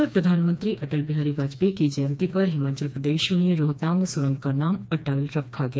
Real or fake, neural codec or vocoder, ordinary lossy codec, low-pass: fake; codec, 16 kHz, 2 kbps, FreqCodec, smaller model; none; none